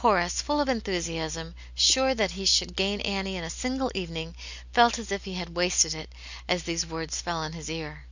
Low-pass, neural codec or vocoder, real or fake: 7.2 kHz; none; real